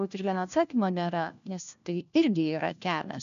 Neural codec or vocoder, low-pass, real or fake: codec, 16 kHz, 1 kbps, FunCodec, trained on LibriTTS, 50 frames a second; 7.2 kHz; fake